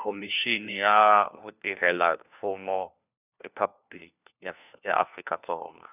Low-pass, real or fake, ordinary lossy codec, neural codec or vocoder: 3.6 kHz; fake; none; codec, 16 kHz, 1 kbps, FunCodec, trained on LibriTTS, 50 frames a second